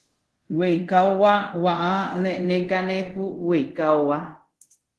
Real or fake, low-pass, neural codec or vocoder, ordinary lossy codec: fake; 10.8 kHz; codec, 24 kHz, 0.5 kbps, DualCodec; Opus, 16 kbps